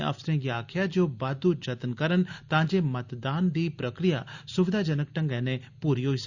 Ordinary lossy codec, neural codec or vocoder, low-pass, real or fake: Opus, 64 kbps; none; 7.2 kHz; real